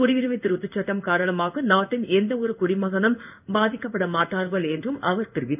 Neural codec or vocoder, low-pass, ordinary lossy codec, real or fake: codec, 16 kHz in and 24 kHz out, 1 kbps, XY-Tokenizer; 3.6 kHz; none; fake